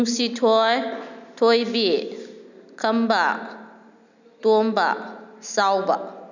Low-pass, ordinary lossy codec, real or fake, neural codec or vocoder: 7.2 kHz; none; real; none